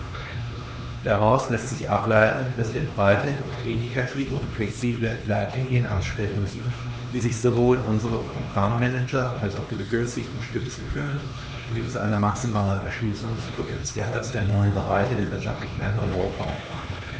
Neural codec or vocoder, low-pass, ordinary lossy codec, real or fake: codec, 16 kHz, 2 kbps, X-Codec, HuBERT features, trained on LibriSpeech; none; none; fake